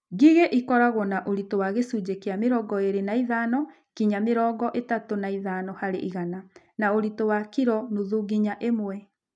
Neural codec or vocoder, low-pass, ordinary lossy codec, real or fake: none; 9.9 kHz; none; real